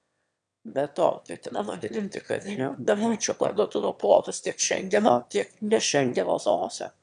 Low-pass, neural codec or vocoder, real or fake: 9.9 kHz; autoencoder, 22.05 kHz, a latent of 192 numbers a frame, VITS, trained on one speaker; fake